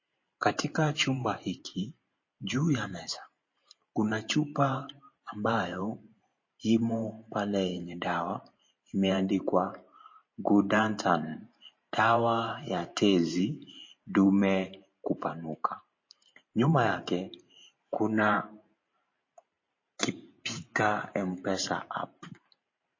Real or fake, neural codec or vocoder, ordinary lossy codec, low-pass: fake; vocoder, 44.1 kHz, 128 mel bands every 512 samples, BigVGAN v2; MP3, 32 kbps; 7.2 kHz